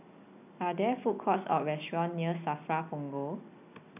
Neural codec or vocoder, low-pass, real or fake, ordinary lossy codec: none; 3.6 kHz; real; none